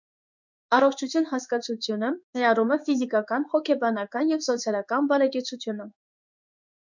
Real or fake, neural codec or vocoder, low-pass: fake; codec, 16 kHz in and 24 kHz out, 1 kbps, XY-Tokenizer; 7.2 kHz